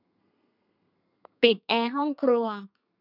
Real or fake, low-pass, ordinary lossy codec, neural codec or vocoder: fake; 5.4 kHz; none; codec, 32 kHz, 1.9 kbps, SNAC